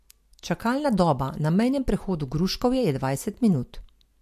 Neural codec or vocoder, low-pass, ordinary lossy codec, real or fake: none; 14.4 kHz; MP3, 64 kbps; real